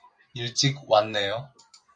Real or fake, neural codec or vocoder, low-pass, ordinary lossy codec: fake; vocoder, 24 kHz, 100 mel bands, Vocos; 9.9 kHz; MP3, 64 kbps